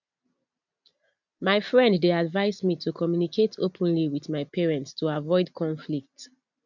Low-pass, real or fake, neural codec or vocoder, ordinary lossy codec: 7.2 kHz; real; none; none